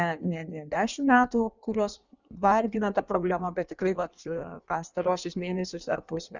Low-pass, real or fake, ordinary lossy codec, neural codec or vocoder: 7.2 kHz; fake; Opus, 64 kbps; codec, 16 kHz in and 24 kHz out, 1.1 kbps, FireRedTTS-2 codec